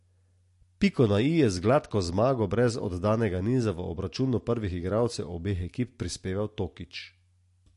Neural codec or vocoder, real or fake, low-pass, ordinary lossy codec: none; real; 14.4 kHz; MP3, 48 kbps